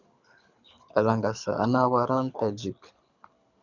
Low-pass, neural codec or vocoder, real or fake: 7.2 kHz; codec, 24 kHz, 6 kbps, HILCodec; fake